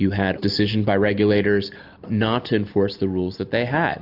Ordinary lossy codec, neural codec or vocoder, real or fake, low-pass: AAC, 48 kbps; none; real; 5.4 kHz